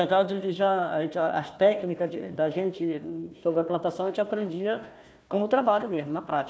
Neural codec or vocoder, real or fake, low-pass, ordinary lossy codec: codec, 16 kHz, 1 kbps, FunCodec, trained on Chinese and English, 50 frames a second; fake; none; none